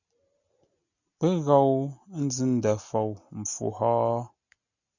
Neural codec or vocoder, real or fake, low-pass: none; real; 7.2 kHz